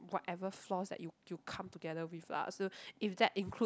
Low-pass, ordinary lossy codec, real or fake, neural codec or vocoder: none; none; real; none